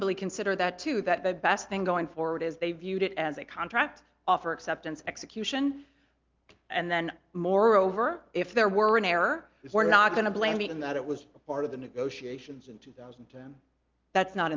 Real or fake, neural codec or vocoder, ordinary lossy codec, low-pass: real; none; Opus, 32 kbps; 7.2 kHz